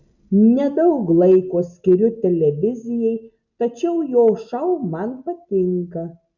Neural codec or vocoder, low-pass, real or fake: none; 7.2 kHz; real